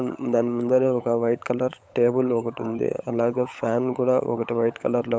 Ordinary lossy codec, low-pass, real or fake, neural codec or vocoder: none; none; fake; codec, 16 kHz, 16 kbps, FunCodec, trained on LibriTTS, 50 frames a second